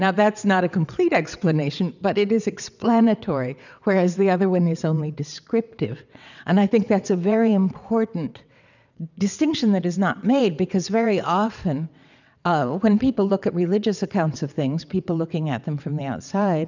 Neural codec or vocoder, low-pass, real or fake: vocoder, 22.05 kHz, 80 mel bands, WaveNeXt; 7.2 kHz; fake